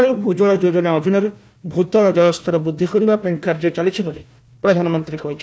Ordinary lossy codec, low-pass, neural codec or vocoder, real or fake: none; none; codec, 16 kHz, 1 kbps, FunCodec, trained on Chinese and English, 50 frames a second; fake